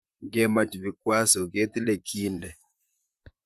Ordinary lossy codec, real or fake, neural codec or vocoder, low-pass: Opus, 64 kbps; fake; vocoder, 44.1 kHz, 128 mel bands, Pupu-Vocoder; 14.4 kHz